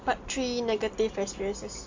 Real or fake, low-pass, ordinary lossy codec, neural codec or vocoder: real; 7.2 kHz; AAC, 48 kbps; none